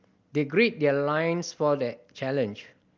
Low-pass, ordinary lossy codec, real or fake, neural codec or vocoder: 7.2 kHz; Opus, 32 kbps; real; none